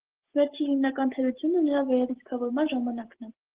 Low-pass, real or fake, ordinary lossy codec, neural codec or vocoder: 3.6 kHz; real; Opus, 16 kbps; none